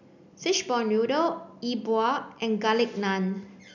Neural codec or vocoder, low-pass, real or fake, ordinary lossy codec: none; 7.2 kHz; real; none